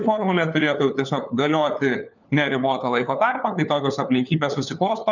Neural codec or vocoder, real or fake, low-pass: codec, 16 kHz, 4 kbps, FunCodec, trained on LibriTTS, 50 frames a second; fake; 7.2 kHz